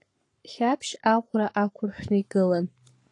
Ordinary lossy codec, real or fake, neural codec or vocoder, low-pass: AAC, 48 kbps; fake; codec, 44.1 kHz, 7.8 kbps, Pupu-Codec; 10.8 kHz